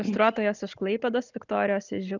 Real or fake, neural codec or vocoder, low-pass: real; none; 7.2 kHz